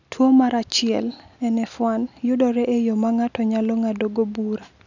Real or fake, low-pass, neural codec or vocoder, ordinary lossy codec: real; 7.2 kHz; none; none